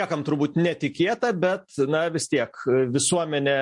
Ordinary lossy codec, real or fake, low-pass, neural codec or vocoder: MP3, 48 kbps; real; 10.8 kHz; none